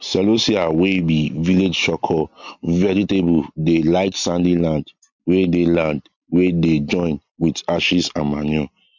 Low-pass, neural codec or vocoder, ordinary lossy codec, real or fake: 7.2 kHz; none; MP3, 48 kbps; real